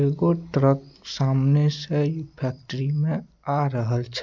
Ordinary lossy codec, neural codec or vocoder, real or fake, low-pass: MP3, 64 kbps; none; real; 7.2 kHz